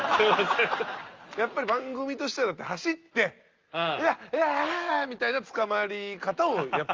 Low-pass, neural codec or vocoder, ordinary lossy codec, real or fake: 7.2 kHz; none; Opus, 32 kbps; real